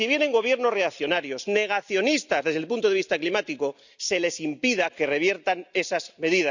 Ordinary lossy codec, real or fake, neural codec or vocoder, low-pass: none; real; none; 7.2 kHz